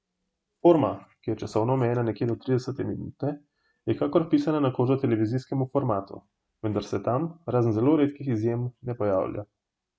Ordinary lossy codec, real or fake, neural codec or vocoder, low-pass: none; real; none; none